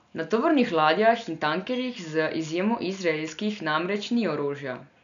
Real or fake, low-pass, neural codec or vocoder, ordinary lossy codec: real; 7.2 kHz; none; none